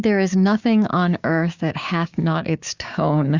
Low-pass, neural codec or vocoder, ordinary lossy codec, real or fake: 7.2 kHz; codec, 16 kHz, 6 kbps, DAC; Opus, 64 kbps; fake